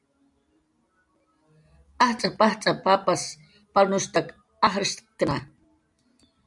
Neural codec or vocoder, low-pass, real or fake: none; 10.8 kHz; real